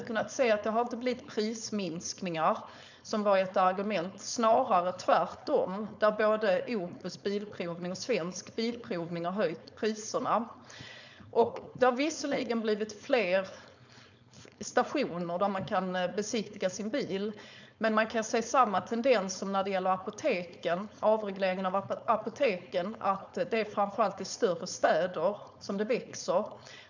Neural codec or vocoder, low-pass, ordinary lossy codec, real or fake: codec, 16 kHz, 4.8 kbps, FACodec; 7.2 kHz; none; fake